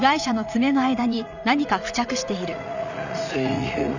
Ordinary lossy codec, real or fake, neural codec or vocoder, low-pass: none; fake; vocoder, 44.1 kHz, 80 mel bands, Vocos; 7.2 kHz